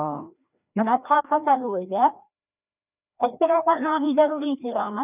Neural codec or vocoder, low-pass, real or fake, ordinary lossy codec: codec, 16 kHz, 1 kbps, FreqCodec, larger model; 3.6 kHz; fake; none